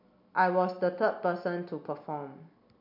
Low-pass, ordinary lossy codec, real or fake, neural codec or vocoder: 5.4 kHz; MP3, 48 kbps; real; none